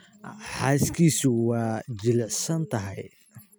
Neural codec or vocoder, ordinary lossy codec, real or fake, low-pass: none; none; real; none